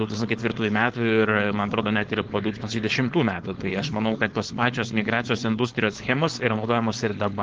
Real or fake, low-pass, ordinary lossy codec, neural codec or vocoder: fake; 7.2 kHz; Opus, 16 kbps; codec, 16 kHz, 4.8 kbps, FACodec